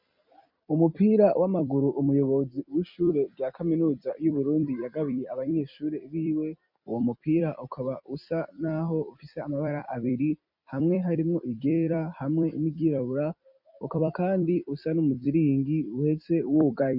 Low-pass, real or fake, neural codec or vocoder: 5.4 kHz; fake; vocoder, 44.1 kHz, 128 mel bands every 256 samples, BigVGAN v2